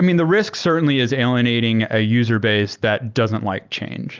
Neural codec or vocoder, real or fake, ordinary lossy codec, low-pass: none; real; Opus, 24 kbps; 7.2 kHz